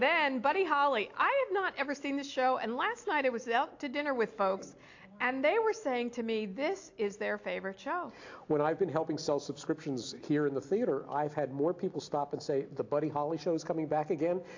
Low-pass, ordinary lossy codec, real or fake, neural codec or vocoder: 7.2 kHz; AAC, 48 kbps; real; none